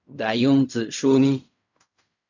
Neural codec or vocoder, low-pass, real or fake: codec, 16 kHz in and 24 kHz out, 0.4 kbps, LongCat-Audio-Codec, fine tuned four codebook decoder; 7.2 kHz; fake